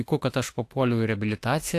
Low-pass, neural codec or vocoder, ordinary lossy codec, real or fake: 14.4 kHz; autoencoder, 48 kHz, 32 numbers a frame, DAC-VAE, trained on Japanese speech; AAC, 64 kbps; fake